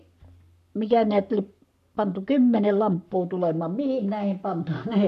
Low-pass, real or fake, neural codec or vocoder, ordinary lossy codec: 14.4 kHz; fake; codec, 44.1 kHz, 7.8 kbps, Pupu-Codec; none